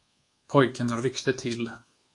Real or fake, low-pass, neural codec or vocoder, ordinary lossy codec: fake; 10.8 kHz; codec, 24 kHz, 1.2 kbps, DualCodec; AAC, 48 kbps